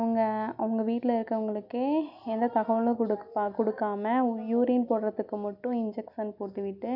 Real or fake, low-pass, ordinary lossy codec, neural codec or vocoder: real; 5.4 kHz; none; none